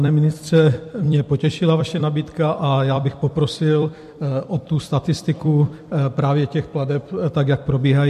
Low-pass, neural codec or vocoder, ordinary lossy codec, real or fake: 14.4 kHz; vocoder, 44.1 kHz, 128 mel bands every 256 samples, BigVGAN v2; MP3, 64 kbps; fake